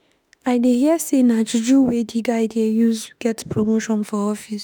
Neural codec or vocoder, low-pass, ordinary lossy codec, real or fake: autoencoder, 48 kHz, 32 numbers a frame, DAC-VAE, trained on Japanese speech; none; none; fake